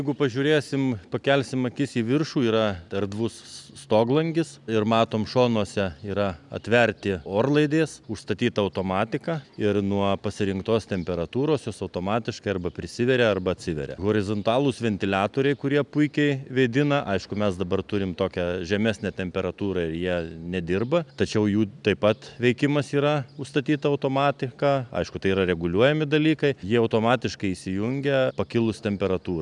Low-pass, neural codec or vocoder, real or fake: 10.8 kHz; none; real